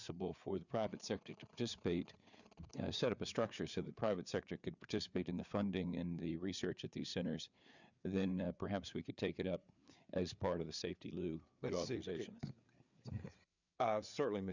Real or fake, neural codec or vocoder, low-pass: fake; codec, 16 kHz, 4 kbps, FreqCodec, larger model; 7.2 kHz